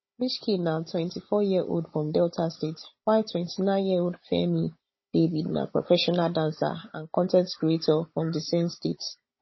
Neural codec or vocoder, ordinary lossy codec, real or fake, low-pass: codec, 16 kHz, 16 kbps, FunCodec, trained on Chinese and English, 50 frames a second; MP3, 24 kbps; fake; 7.2 kHz